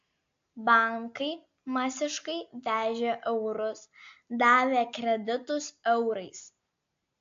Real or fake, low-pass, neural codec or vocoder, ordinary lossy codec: real; 7.2 kHz; none; AAC, 48 kbps